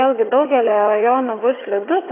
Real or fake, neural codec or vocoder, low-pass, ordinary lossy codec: fake; vocoder, 22.05 kHz, 80 mel bands, HiFi-GAN; 3.6 kHz; AAC, 24 kbps